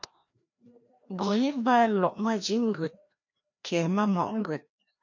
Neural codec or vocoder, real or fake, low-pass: codec, 16 kHz, 1 kbps, FreqCodec, larger model; fake; 7.2 kHz